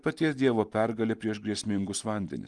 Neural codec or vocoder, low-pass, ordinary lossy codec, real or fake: none; 10.8 kHz; Opus, 24 kbps; real